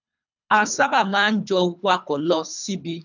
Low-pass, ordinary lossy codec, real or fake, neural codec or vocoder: 7.2 kHz; none; fake; codec, 24 kHz, 3 kbps, HILCodec